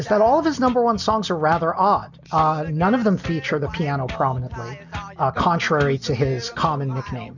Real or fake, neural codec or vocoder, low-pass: real; none; 7.2 kHz